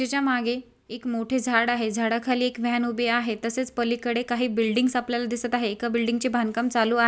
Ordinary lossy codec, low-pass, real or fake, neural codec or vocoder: none; none; real; none